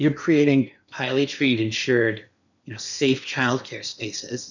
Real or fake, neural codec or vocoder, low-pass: fake; codec, 16 kHz in and 24 kHz out, 0.8 kbps, FocalCodec, streaming, 65536 codes; 7.2 kHz